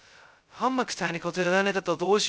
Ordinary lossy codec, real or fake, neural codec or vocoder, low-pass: none; fake; codec, 16 kHz, 0.2 kbps, FocalCodec; none